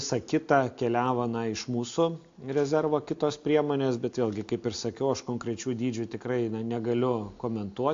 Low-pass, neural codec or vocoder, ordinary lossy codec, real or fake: 7.2 kHz; none; AAC, 48 kbps; real